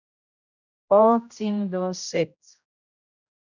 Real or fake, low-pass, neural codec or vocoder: fake; 7.2 kHz; codec, 16 kHz, 0.5 kbps, X-Codec, HuBERT features, trained on general audio